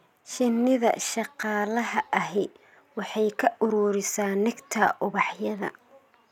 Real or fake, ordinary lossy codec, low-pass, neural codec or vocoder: real; none; 19.8 kHz; none